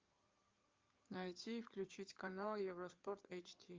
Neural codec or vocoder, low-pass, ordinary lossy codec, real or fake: codec, 16 kHz in and 24 kHz out, 2.2 kbps, FireRedTTS-2 codec; 7.2 kHz; Opus, 24 kbps; fake